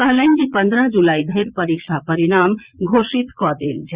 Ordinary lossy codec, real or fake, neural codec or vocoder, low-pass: Opus, 64 kbps; fake; vocoder, 22.05 kHz, 80 mel bands, Vocos; 3.6 kHz